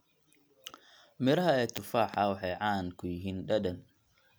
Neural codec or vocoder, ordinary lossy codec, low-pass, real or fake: none; none; none; real